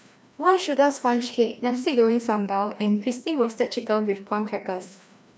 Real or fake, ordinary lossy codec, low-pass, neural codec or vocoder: fake; none; none; codec, 16 kHz, 1 kbps, FreqCodec, larger model